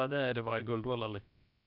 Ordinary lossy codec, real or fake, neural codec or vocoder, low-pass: none; fake; codec, 16 kHz, about 1 kbps, DyCAST, with the encoder's durations; 5.4 kHz